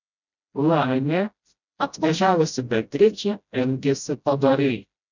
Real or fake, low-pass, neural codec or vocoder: fake; 7.2 kHz; codec, 16 kHz, 0.5 kbps, FreqCodec, smaller model